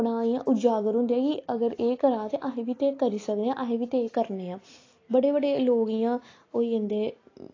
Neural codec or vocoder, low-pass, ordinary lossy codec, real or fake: none; 7.2 kHz; AAC, 32 kbps; real